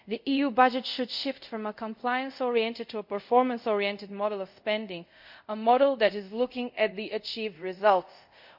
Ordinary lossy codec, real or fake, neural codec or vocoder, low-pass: MP3, 48 kbps; fake; codec, 24 kHz, 0.5 kbps, DualCodec; 5.4 kHz